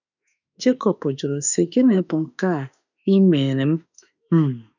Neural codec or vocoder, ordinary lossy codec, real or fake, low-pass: autoencoder, 48 kHz, 32 numbers a frame, DAC-VAE, trained on Japanese speech; none; fake; 7.2 kHz